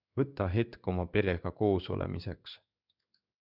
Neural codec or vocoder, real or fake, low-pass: codec, 16 kHz in and 24 kHz out, 1 kbps, XY-Tokenizer; fake; 5.4 kHz